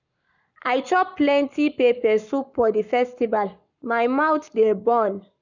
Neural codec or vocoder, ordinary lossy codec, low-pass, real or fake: codec, 44.1 kHz, 7.8 kbps, Pupu-Codec; none; 7.2 kHz; fake